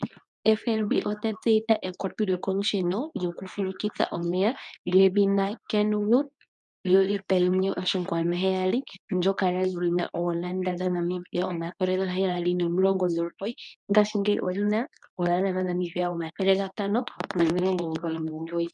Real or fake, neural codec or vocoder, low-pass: fake; codec, 24 kHz, 0.9 kbps, WavTokenizer, medium speech release version 2; 10.8 kHz